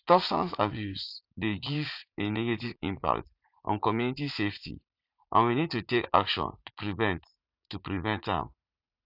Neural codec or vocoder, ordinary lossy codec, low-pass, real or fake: vocoder, 44.1 kHz, 80 mel bands, Vocos; none; 5.4 kHz; fake